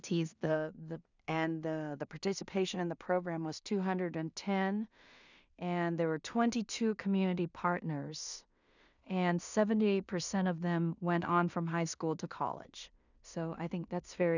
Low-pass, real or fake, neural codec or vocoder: 7.2 kHz; fake; codec, 16 kHz in and 24 kHz out, 0.4 kbps, LongCat-Audio-Codec, two codebook decoder